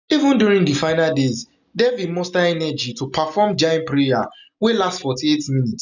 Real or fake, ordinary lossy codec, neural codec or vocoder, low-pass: real; none; none; 7.2 kHz